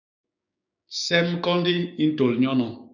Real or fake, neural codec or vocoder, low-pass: fake; codec, 16 kHz, 6 kbps, DAC; 7.2 kHz